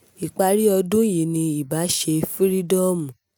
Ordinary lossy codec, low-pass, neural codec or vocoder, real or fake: none; none; none; real